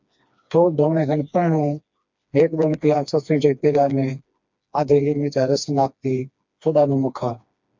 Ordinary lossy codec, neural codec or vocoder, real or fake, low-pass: MP3, 64 kbps; codec, 16 kHz, 2 kbps, FreqCodec, smaller model; fake; 7.2 kHz